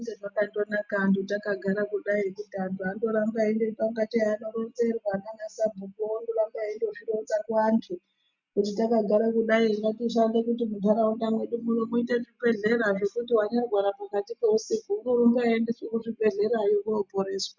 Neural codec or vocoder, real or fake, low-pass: none; real; 7.2 kHz